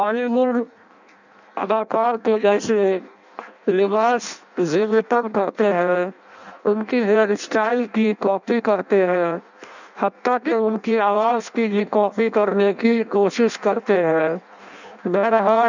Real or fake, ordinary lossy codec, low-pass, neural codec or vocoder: fake; none; 7.2 kHz; codec, 16 kHz in and 24 kHz out, 0.6 kbps, FireRedTTS-2 codec